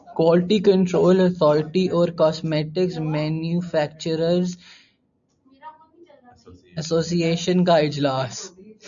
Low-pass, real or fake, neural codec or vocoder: 7.2 kHz; real; none